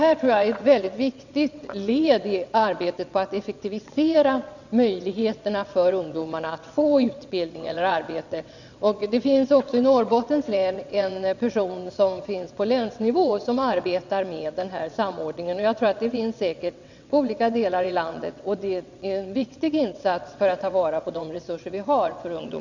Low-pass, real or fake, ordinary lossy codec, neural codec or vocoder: 7.2 kHz; fake; none; vocoder, 22.05 kHz, 80 mel bands, WaveNeXt